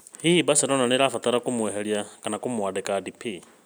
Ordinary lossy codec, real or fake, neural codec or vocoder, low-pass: none; real; none; none